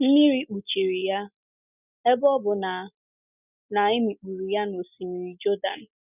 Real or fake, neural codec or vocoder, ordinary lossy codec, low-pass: real; none; none; 3.6 kHz